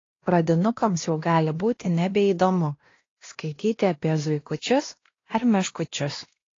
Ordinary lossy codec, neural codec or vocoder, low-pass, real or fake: AAC, 32 kbps; codec, 16 kHz, 1 kbps, X-Codec, WavLM features, trained on Multilingual LibriSpeech; 7.2 kHz; fake